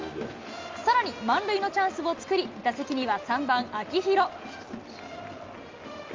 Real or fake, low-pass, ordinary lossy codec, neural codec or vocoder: real; 7.2 kHz; Opus, 32 kbps; none